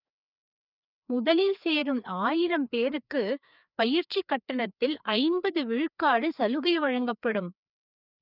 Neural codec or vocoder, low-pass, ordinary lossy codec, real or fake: codec, 16 kHz, 2 kbps, FreqCodec, larger model; 5.4 kHz; none; fake